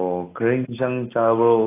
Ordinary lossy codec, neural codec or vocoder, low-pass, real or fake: AAC, 16 kbps; none; 3.6 kHz; real